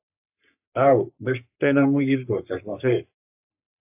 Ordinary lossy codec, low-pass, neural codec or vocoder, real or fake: AAC, 32 kbps; 3.6 kHz; codec, 44.1 kHz, 3.4 kbps, Pupu-Codec; fake